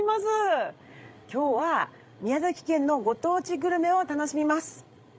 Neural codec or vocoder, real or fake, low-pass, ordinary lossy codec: codec, 16 kHz, 16 kbps, FreqCodec, larger model; fake; none; none